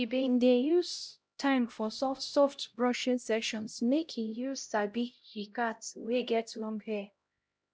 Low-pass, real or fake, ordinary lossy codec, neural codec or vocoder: none; fake; none; codec, 16 kHz, 0.5 kbps, X-Codec, HuBERT features, trained on LibriSpeech